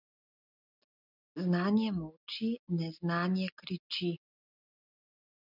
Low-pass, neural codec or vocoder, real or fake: 5.4 kHz; none; real